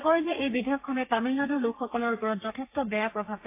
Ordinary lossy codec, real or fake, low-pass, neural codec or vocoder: AAC, 24 kbps; fake; 3.6 kHz; codec, 32 kHz, 1.9 kbps, SNAC